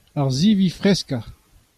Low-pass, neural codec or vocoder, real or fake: 14.4 kHz; none; real